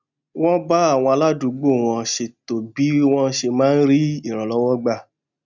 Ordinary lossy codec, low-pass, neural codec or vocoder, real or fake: none; 7.2 kHz; none; real